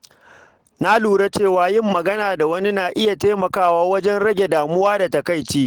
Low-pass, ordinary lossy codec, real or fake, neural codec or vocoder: 19.8 kHz; Opus, 16 kbps; real; none